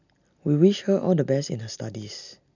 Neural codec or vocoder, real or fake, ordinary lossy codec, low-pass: none; real; none; 7.2 kHz